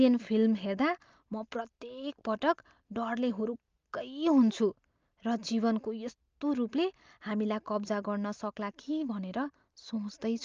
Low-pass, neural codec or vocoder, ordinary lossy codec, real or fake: 7.2 kHz; none; Opus, 24 kbps; real